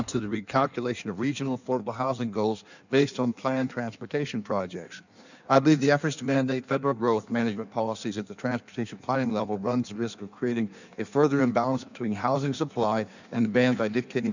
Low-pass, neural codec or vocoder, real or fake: 7.2 kHz; codec, 16 kHz in and 24 kHz out, 1.1 kbps, FireRedTTS-2 codec; fake